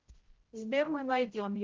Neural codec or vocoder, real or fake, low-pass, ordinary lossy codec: codec, 16 kHz, 0.5 kbps, X-Codec, HuBERT features, trained on general audio; fake; 7.2 kHz; Opus, 16 kbps